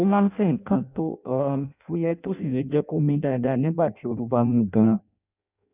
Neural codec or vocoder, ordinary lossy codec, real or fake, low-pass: codec, 16 kHz in and 24 kHz out, 0.6 kbps, FireRedTTS-2 codec; none; fake; 3.6 kHz